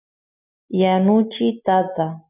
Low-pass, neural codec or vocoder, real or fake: 3.6 kHz; none; real